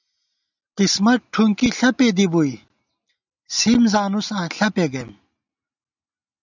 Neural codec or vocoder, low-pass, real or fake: none; 7.2 kHz; real